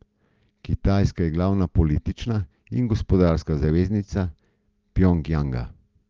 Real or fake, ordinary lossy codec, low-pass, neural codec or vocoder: real; Opus, 32 kbps; 7.2 kHz; none